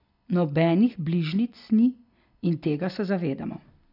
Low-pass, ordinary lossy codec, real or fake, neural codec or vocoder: 5.4 kHz; none; real; none